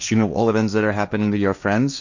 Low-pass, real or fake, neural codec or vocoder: 7.2 kHz; fake; codec, 16 kHz, 1.1 kbps, Voila-Tokenizer